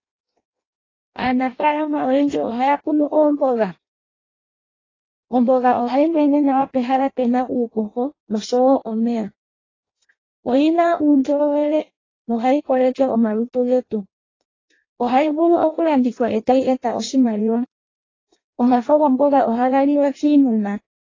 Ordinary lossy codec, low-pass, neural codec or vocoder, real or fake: AAC, 32 kbps; 7.2 kHz; codec, 16 kHz in and 24 kHz out, 0.6 kbps, FireRedTTS-2 codec; fake